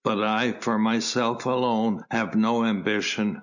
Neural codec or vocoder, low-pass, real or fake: none; 7.2 kHz; real